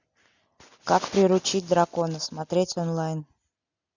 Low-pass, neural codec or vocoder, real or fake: 7.2 kHz; none; real